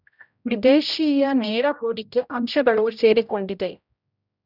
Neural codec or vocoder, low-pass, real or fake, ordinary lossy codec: codec, 16 kHz, 0.5 kbps, X-Codec, HuBERT features, trained on general audio; 5.4 kHz; fake; none